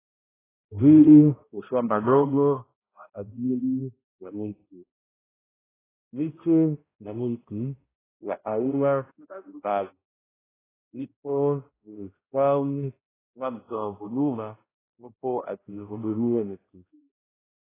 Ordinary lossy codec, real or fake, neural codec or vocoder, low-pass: AAC, 16 kbps; fake; codec, 16 kHz, 0.5 kbps, X-Codec, HuBERT features, trained on balanced general audio; 3.6 kHz